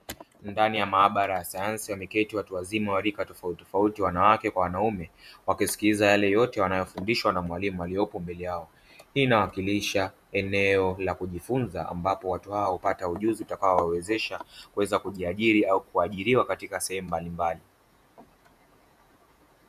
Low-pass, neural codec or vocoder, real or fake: 14.4 kHz; vocoder, 48 kHz, 128 mel bands, Vocos; fake